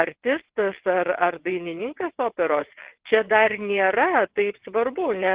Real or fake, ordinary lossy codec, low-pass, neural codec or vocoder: fake; Opus, 16 kbps; 3.6 kHz; vocoder, 22.05 kHz, 80 mel bands, WaveNeXt